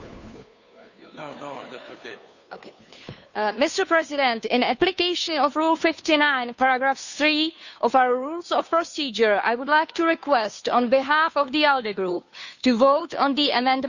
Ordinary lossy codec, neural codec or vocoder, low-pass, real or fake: none; codec, 16 kHz, 2 kbps, FunCodec, trained on Chinese and English, 25 frames a second; 7.2 kHz; fake